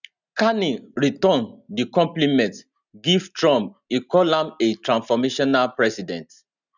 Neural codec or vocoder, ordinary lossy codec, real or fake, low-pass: none; none; real; 7.2 kHz